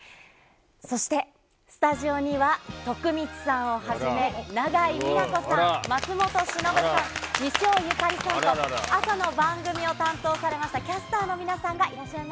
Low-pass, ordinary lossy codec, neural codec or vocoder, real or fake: none; none; none; real